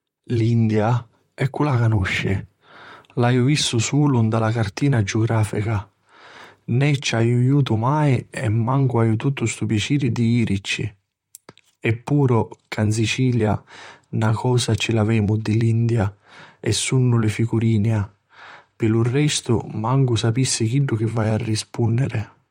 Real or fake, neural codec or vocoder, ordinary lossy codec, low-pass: fake; vocoder, 44.1 kHz, 128 mel bands, Pupu-Vocoder; MP3, 64 kbps; 19.8 kHz